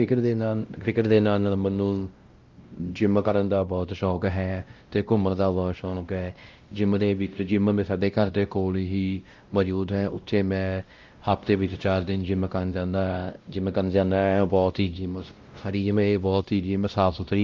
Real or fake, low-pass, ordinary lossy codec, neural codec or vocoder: fake; 7.2 kHz; Opus, 32 kbps; codec, 16 kHz, 0.5 kbps, X-Codec, WavLM features, trained on Multilingual LibriSpeech